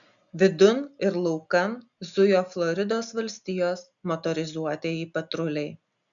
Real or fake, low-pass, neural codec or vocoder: real; 7.2 kHz; none